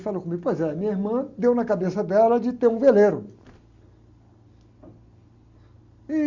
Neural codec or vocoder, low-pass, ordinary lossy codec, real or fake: none; 7.2 kHz; Opus, 64 kbps; real